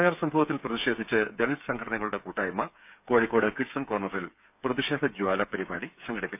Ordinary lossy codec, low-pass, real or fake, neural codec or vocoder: none; 3.6 kHz; fake; codec, 16 kHz, 8 kbps, FreqCodec, smaller model